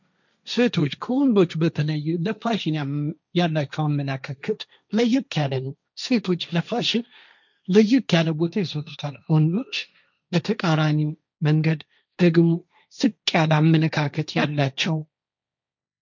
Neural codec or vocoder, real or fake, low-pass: codec, 16 kHz, 1.1 kbps, Voila-Tokenizer; fake; 7.2 kHz